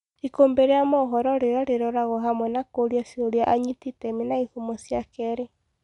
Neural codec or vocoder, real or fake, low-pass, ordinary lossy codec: none; real; 10.8 kHz; none